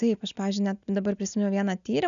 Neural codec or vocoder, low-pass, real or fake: none; 7.2 kHz; real